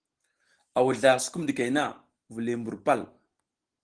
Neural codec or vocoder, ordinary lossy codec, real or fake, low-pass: none; Opus, 16 kbps; real; 9.9 kHz